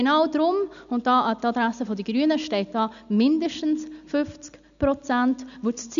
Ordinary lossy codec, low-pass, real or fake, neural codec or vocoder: none; 7.2 kHz; real; none